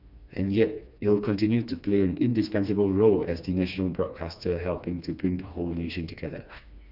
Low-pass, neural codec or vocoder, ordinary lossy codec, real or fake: 5.4 kHz; codec, 16 kHz, 2 kbps, FreqCodec, smaller model; none; fake